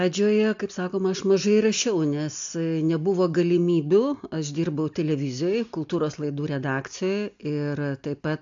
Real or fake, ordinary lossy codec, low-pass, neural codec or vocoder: real; AAC, 64 kbps; 7.2 kHz; none